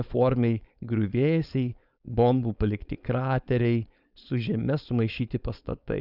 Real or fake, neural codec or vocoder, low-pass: fake; codec, 16 kHz, 4.8 kbps, FACodec; 5.4 kHz